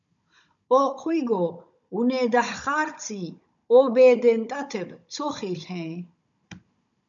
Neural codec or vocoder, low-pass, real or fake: codec, 16 kHz, 16 kbps, FunCodec, trained on Chinese and English, 50 frames a second; 7.2 kHz; fake